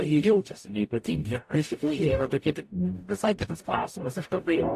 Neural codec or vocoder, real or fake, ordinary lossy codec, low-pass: codec, 44.1 kHz, 0.9 kbps, DAC; fake; AAC, 64 kbps; 14.4 kHz